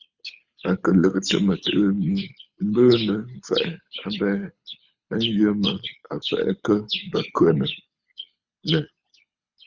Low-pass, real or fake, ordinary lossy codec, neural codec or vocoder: 7.2 kHz; fake; Opus, 64 kbps; codec, 24 kHz, 6 kbps, HILCodec